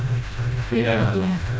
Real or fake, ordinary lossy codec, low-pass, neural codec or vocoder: fake; none; none; codec, 16 kHz, 0.5 kbps, FreqCodec, smaller model